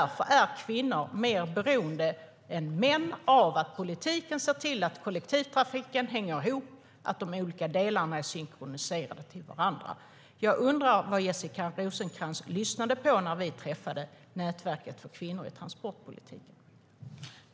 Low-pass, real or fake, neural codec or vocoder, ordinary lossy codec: none; real; none; none